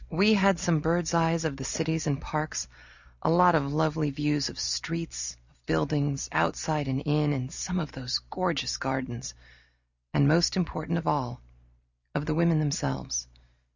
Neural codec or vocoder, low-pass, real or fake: none; 7.2 kHz; real